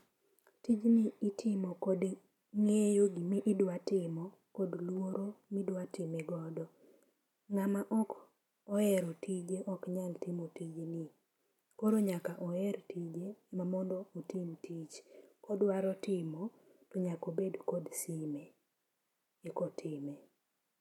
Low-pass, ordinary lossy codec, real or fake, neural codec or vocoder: 19.8 kHz; none; real; none